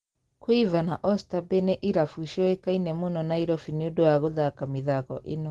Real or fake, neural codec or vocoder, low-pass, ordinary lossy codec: real; none; 10.8 kHz; Opus, 16 kbps